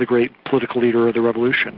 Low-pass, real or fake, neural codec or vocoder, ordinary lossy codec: 5.4 kHz; real; none; Opus, 16 kbps